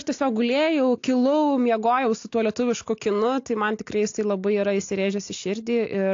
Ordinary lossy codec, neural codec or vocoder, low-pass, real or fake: AAC, 48 kbps; none; 7.2 kHz; real